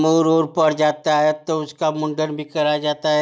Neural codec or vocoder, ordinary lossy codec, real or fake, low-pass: none; none; real; none